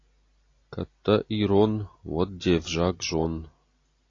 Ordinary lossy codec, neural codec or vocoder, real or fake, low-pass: AAC, 32 kbps; none; real; 7.2 kHz